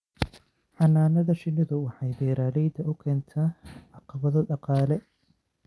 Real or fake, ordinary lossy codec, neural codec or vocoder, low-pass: real; none; none; none